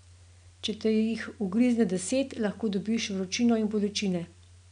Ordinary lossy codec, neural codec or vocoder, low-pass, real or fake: none; none; 9.9 kHz; real